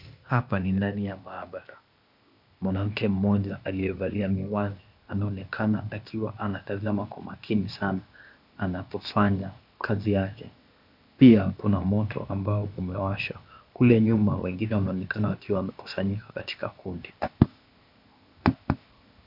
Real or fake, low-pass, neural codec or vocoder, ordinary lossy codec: fake; 5.4 kHz; codec, 16 kHz, 0.8 kbps, ZipCodec; MP3, 48 kbps